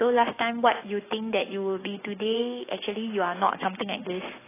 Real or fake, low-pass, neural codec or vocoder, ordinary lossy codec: fake; 3.6 kHz; codec, 16 kHz, 6 kbps, DAC; AAC, 16 kbps